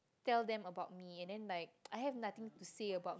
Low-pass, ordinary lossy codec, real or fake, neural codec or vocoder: none; none; real; none